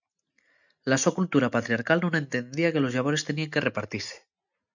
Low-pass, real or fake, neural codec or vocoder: 7.2 kHz; real; none